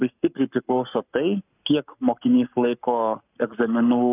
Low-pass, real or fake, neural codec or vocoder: 3.6 kHz; fake; codec, 44.1 kHz, 7.8 kbps, Pupu-Codec